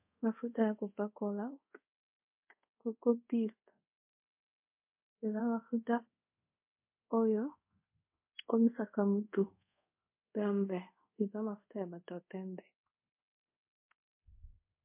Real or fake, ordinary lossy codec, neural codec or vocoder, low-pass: fake; MP3, 32 kbps; codec, 24 kHz, 0.5 kbps, DualCodec; 3.6 kHz